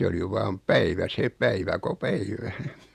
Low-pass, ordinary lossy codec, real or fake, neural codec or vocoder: 14.4 kHz; none; fake; vocoder, 48 kHz, 128 mel bands, Vocos